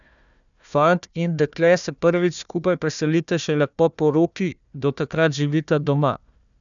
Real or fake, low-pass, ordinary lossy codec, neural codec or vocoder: fake; 7.2 kHz; none; codec, 16 kHz, 1 kbps, FunCodec, trained on Chinese and English, 50 frames a second